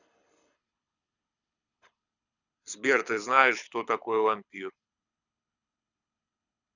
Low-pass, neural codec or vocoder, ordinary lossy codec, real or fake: 7.2 kHz; codec, 24 kHz, 6 kbps, HILCodec; none; fake